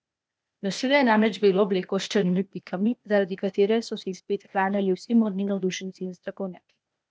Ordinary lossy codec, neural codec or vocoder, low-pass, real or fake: none; codec, 16 kHz, 0.8 kbps, ZipCodec; none; fake